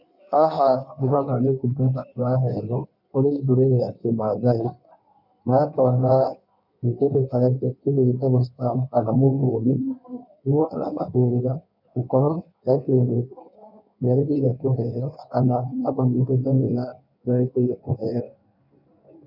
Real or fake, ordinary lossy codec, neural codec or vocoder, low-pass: fake; none; codec, 16 kHz in and 24 kHz out, 1.1 kbps, FireRedTTS-2 codec; 5.4 kHz